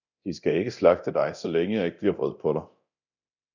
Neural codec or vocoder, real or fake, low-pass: codec, 24 kHz, 0.9 kbps, DualCodec; fake; 7.2 kHz